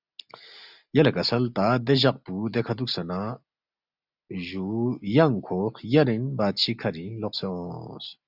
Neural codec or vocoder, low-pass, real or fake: none; 5.4 kHz; real